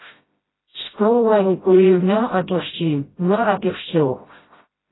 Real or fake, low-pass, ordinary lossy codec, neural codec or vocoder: fake; 7.2 kHz; AAC, 16 kbps; codec, 16 kHz, 0.5 kbps, FreqCodec, smaller model